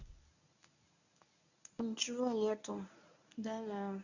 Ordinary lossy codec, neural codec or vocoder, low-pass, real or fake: none; codec, 24 kHz, 0.9 kbps, WavTokenizer, medium speech release version 1; 7.2 kHz; fake